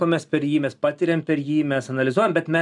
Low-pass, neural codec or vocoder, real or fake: 10.8 kHz; none; real